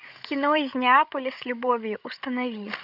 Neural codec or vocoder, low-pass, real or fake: codec, 16 kHz, 16 kbps, FreqCodec, larger model; 5.4 kHz; fake